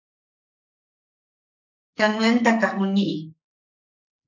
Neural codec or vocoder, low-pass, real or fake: codec, 44.1 kHz, 2.6 kbps, SNAC; 7.2 kHz; fake